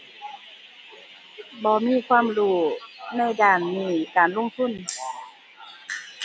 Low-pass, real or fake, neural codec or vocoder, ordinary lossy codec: none; real; none; none